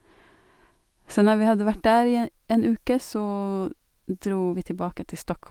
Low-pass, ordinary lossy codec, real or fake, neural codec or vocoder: 19.8 kHz; Opus, 24 kbps; fake; autoencoder, 48 kHz, 128 numbers a frame, DAC-VAE, trained on Japanese speech